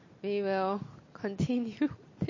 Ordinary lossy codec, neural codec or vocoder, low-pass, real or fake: MP3, 32 kbps; none; 7.2 kHz; real